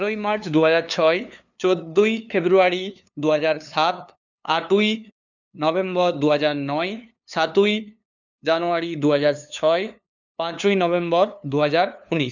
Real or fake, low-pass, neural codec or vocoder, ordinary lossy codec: fake; 7.2 kHz; codec, 16 kHz, 2 kbps, FunCodec, trained on LibriTTS, 25 frames a second; none